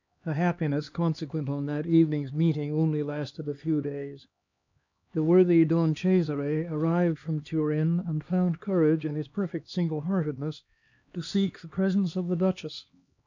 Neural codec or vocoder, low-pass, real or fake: codec, 16 kHz, 2 kbps, X-Codec, HuBERT features, trained on LibriSpeech; 7.2 kHz; fake